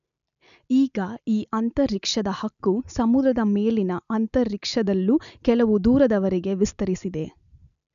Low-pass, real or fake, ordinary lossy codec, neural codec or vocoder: 7.2 kHz; real; none; none